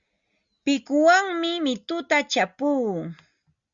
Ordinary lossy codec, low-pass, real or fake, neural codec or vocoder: Opus, 64 kbps; 7.2 kHz; real; none